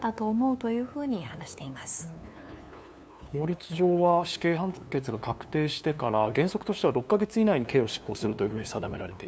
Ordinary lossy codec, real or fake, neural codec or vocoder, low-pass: none; fake; codec, 16 kHz, 2 kbps, FunCodec, trained on LibriTTS, 25 frames a second; none